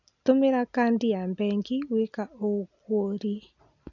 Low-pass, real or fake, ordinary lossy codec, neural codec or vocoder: 7.2 kHz; real; none; none